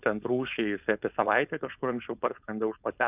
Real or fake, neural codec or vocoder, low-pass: fake; codec, 16 kHz, 4.8 kbps, FACodec; 3.6 kHz